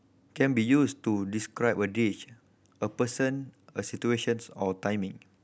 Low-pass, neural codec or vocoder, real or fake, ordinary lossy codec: none; none; real; none